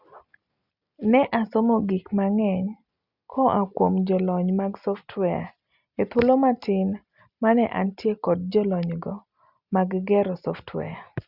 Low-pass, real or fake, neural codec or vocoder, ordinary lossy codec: 5.4 kHz; real; none; Opus, 64 kbps